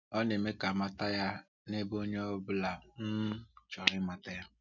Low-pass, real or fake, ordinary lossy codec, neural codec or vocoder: 7.2 kHz; real; none; none